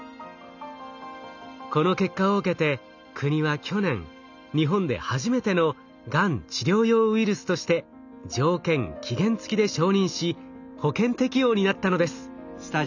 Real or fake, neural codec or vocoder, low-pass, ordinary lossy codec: real; none; 7.2 kHz; none